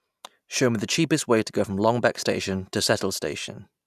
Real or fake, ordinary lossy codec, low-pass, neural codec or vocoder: real; none; 14.4 kHz; none